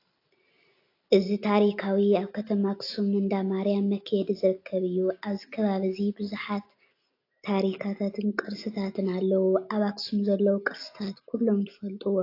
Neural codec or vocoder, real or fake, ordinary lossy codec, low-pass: none; real; AAC, 32 kbps; 5.4 kHz